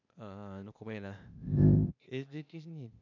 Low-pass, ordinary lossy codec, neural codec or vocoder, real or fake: 7.2 kHz; none; codec, 16 kHz, 0.8 kbps, ZipCodec; fake